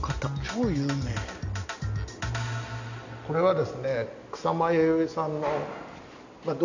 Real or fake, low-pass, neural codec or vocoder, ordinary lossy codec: real; 7.2 kHz; none; none